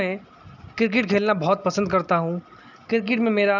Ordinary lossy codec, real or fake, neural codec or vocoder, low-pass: none; real; none; 7.2 kHz